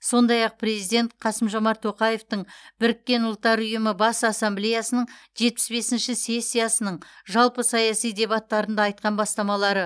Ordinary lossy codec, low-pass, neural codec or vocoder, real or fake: none; none; none; real